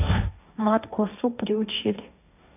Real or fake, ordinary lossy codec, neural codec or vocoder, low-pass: fake; none; codec, 16 kHz, 1.1 kbps, Voila-Tokenizer; 3.6 kHz